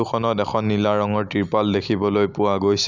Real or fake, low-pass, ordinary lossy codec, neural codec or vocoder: real; 7.2 kHz; none; none